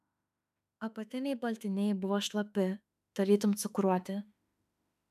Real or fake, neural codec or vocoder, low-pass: fake; autoencoder, 48 kHz, 32 numbers a frame, DAC-VAE, trained on Japanese speech; 14.4 kHz